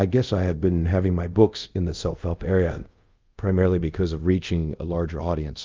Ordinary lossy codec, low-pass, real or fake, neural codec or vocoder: Opus, 24 kbps; 7.2 kHz; fake; codec, 24 kHz, 0.5 kbps, DualCodec